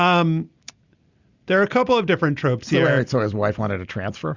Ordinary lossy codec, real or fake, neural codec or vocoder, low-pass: Opus, 64 kbps; real; none; 7.2 kHz